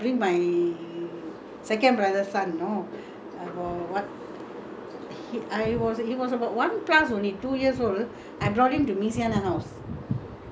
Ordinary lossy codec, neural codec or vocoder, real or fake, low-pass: none; none; real; none